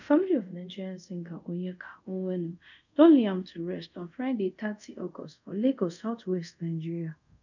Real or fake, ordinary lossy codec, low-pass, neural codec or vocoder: fake; none; 7.2 kHz; codec, 24 kHz, 0.5 kbps, DualCodec